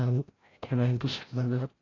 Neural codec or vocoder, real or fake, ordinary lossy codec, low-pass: codec, 16 kHz, 0.5 kbps, FreqCodec, larger model; fake; AAC, 32 kbps; 7.2 kHz